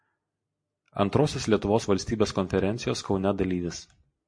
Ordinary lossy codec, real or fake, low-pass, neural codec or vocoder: MP3, 48 kbps; real; 9.9 kHz; none